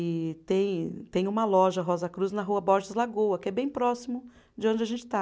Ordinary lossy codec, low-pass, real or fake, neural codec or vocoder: none; none; real; none